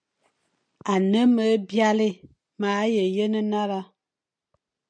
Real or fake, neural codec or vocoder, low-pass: real; none; 9.9 kHz